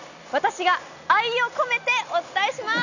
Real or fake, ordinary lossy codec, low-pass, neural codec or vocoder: real; none; 7.2 kHz; none